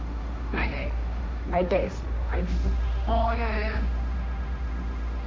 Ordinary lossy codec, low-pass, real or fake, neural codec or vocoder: none; none; fake; codec, 16 kHz, 1.1 kbps, Voila-Tokenizer